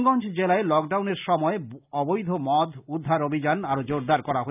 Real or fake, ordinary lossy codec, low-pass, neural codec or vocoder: real; none; 3.6 kHz; none